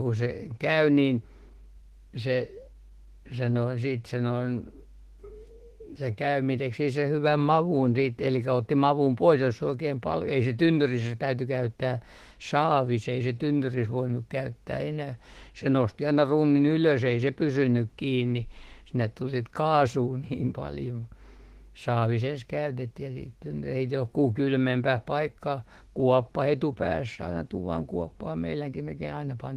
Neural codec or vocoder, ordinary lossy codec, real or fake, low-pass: autoencoder, 48 kHz, 32 numbers a frame, DAC-VAE, trained on Japanese speech; Opus, 16 kbps; fake; 14.4 kHz